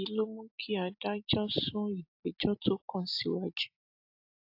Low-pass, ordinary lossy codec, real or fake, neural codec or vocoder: 5.4 kHz; AAC, 48 kbps; real; none